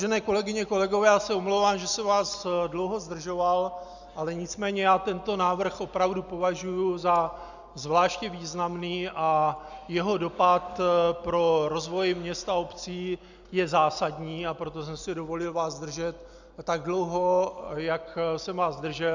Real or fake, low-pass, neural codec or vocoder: real; 7.2 kHz; none